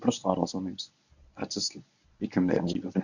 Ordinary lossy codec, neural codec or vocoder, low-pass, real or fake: none; codec, 24 kHz, 0.9 kbps, WavTokenizer, medium speech release version 1; 7.2 kHz; fake